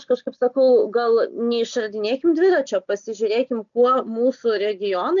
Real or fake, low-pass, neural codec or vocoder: real; 7.2 kHz; none